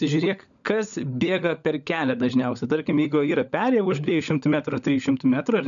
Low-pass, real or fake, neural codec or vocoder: 7.2 kHz; fake; codec, 16 kHz, 16 kbps, FunCodec, trained on LibriTTS, 50 frames a second